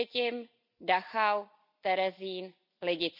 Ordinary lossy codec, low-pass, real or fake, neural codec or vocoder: none; 5.4 kHz; real; none